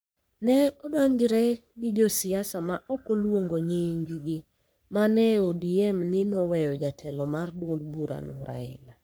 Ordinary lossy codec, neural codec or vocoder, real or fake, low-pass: none; codec, 44.1 kHz, 3.4 kbps, Pupu-Codec; fake; none